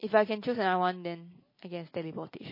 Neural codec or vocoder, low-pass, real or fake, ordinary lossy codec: none; 5.4 kHz; real; MP3, 24 kbps